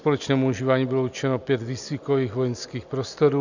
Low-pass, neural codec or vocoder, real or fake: 7.2 kHz; none; real